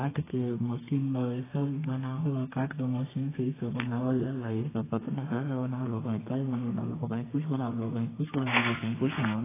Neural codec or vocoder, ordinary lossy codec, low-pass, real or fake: codec, 32 kHz, 1.9 kbps, SNAC; AAC, 16 kbps; 3.6 kHz; fake